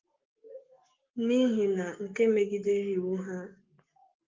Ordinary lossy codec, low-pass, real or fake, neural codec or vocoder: Opus, 32 kbps; 7.2 kHz; fake; codec, 44.1 kHz, 7.8 kbps, Pupu-Codec